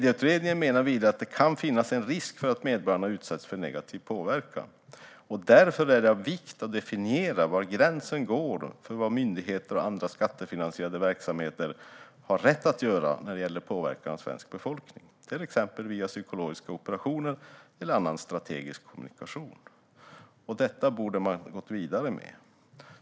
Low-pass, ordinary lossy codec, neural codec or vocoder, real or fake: none; none; none; real